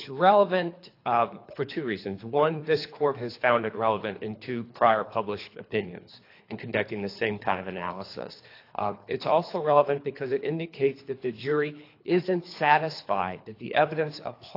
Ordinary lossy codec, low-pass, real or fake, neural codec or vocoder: AAC, 32 kbps; 5.4 kHz; fake; codec, 24 kHz, 3 kbps, HILCodec